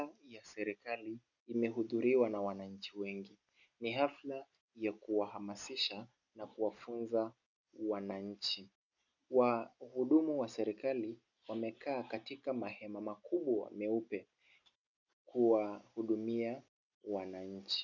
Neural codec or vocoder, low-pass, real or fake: none; 7.2 kHz; real